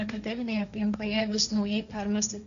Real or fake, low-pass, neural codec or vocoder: fake; 7.2 kHz; codec, 16 kHz, 1.1 kbps, Voila-Tokenizer